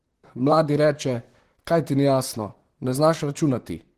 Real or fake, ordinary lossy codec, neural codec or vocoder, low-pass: fake; Opus, 16 kbps; vocoder, 44.1 kHz, 128 mel bands, Pupu-Vocoder; 14.4 kHz